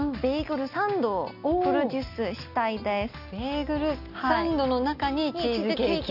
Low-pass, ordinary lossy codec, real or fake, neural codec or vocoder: 5.4 kHz; none; real; none